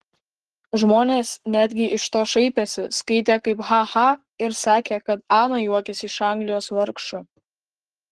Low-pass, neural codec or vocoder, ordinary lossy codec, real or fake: 10.8 kHz; codec, 44.1 kHz, 7.8 kbps, Pupu-Codec; Opus, 16 kbps; fake